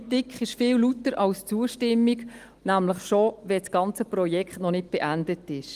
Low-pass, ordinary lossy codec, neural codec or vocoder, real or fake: 14.4 kHz; Opus, 24 kbps; none; real